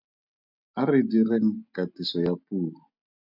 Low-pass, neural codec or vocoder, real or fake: 5.4 kHz; none; real